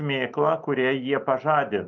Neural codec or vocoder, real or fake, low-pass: none; real; 7.2 kHz